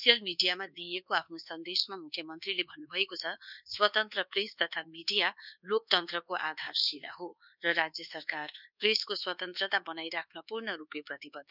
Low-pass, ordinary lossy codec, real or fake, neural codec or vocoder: 5.4 kHz; none; fake; codec, 24 kHz, 1.2 kbps, DualCodec